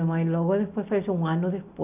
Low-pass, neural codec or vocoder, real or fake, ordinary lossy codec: 3.6 kHz; none; real; none